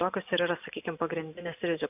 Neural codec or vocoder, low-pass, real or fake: none; 3.6 kHz; real